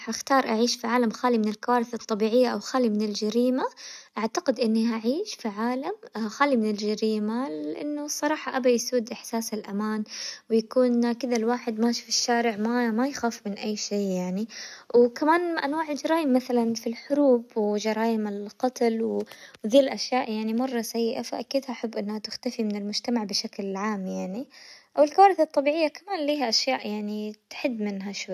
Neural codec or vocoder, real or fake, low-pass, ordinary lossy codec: none; real; 14.4 kHz; none